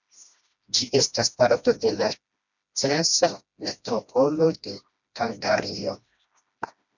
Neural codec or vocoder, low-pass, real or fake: codec, 16 kHz, 1 kbps, FreqCodec, smaller model; 7.2 kHz; fake